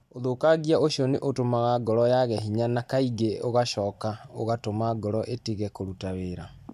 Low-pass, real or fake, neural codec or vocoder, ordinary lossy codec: 14.4 kHz; real; none; none